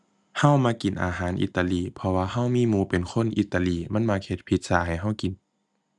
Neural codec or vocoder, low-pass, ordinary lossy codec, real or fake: none; none; none; real